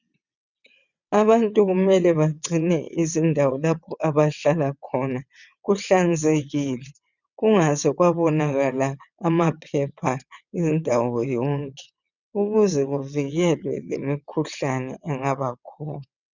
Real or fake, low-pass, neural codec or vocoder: fake; 7.2 kHz; vocoder, 22.05 kHz, 80 mel bands, WaveNeXt